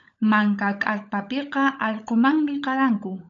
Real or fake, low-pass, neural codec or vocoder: fake; 7.2 kHz; codec, 16 kHz, 16 kbps, FunCodec, trained on LibriTTS, 50 frames a second